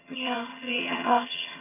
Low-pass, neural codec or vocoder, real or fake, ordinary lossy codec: 3.6 kHz; vocoder, 22.05 kHz, 80 mel bands, HiFi-GAN; fake; none